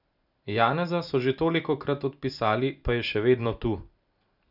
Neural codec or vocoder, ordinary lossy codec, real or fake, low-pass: none; none; real; 5.4 kHz